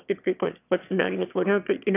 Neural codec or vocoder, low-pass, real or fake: autoencoder, 22.05 kHz, a latent of 192 numbers a frame, VITS, trained on one speaker; 3.6 kHz; fake